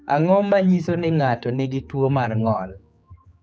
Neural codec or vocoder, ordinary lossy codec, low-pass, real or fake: codec, 16 kHz, 4 kbps, X-Codec, HuBERT features, trained on general audio; none; none; fake